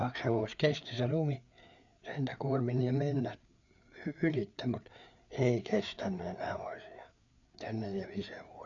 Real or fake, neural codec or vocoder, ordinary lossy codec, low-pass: fake; codec, 16 kHz, 4 kbps, FreqCodec, larger model; none; 7.2 kHz